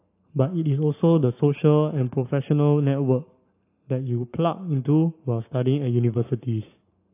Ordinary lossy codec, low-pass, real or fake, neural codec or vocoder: AAC, 24 kbps; 3.6 kHz; fake; codec, 44.1 kHz, 7.8 kbps, Pupu-Codec